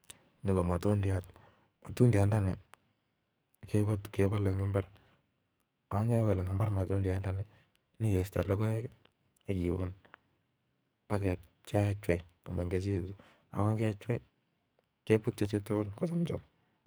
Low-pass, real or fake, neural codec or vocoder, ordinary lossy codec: none; fake; codec, 44.1 kHz, 2.6 kbps, SNAC; none